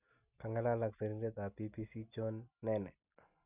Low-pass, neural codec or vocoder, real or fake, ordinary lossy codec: 3.6 kHz; none; real; none